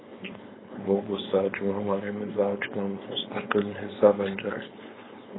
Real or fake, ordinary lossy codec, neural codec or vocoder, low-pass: fake; AAC, 16 kbps; codec, 16 kHz, 4.8 kbps, FACodec; 7.2 kHz